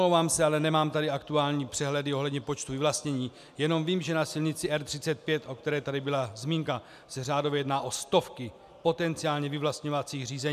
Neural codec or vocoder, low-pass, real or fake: none; 14.4 kHz; real